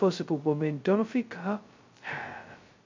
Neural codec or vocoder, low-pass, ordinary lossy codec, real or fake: codec, 16 kHz, 0.2 kbps, FocalCodec; 7.2 kHz; MP3, 48 kbps; fake